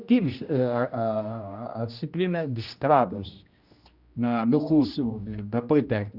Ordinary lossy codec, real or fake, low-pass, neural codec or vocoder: Opus, 64 kbps; fake; 5.4 kHz; codec, 16 kHz, 1 kbps, X-Codec, HuBERT features, trained on general audio